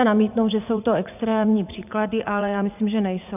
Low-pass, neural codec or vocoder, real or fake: 3.6 kHz; vocoder, 44.1 kHz, 80 mel bands, Vocos; fake